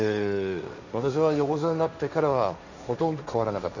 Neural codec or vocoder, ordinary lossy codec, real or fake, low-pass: codec, 16 kHz, 1.1 kbps, Voila-Tokenizer; none; fake; 7.2 kHz